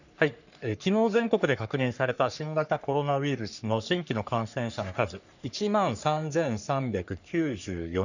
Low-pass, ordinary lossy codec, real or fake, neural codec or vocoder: 7.2 kHz; none; fake; codec, 44.1 kHz, 3.4 kbps, Pupu-Codec